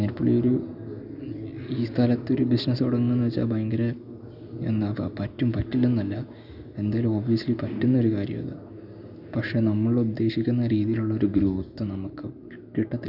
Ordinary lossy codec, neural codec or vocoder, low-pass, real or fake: none; none; 5.4 kHz; real